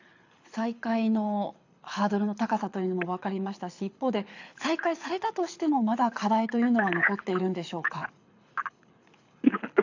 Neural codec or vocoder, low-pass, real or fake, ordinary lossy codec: codec, 24 kHz, 6 kbps, HILCodec; 7.2 kHz; fake; AAC, 48 kbps